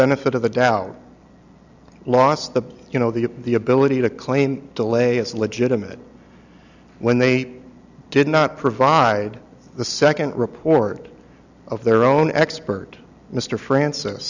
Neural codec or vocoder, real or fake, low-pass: none; real; 7.2 kHz